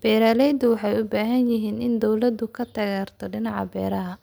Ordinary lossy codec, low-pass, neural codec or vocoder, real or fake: none; none; none; real